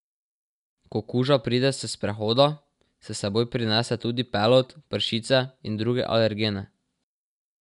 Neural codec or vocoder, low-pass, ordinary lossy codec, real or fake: none; 10.8 kHz; none; real